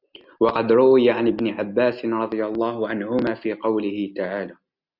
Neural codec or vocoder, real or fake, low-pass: none; real; 5.4 kHz